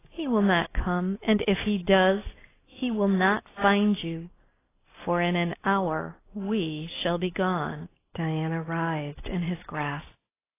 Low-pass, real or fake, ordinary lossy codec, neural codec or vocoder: 3.6 kHz; real; AAC, 16 kbps; none